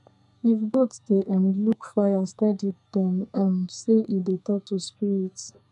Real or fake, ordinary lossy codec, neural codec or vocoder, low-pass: fake; none; codec, 44.1 kHz, 2.6 kbps, SNAC; 10.8 kHz